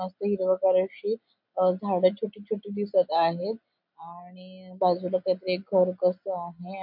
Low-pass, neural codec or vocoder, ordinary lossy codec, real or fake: 5.4 kHz; none; MP3, 48 kbps; real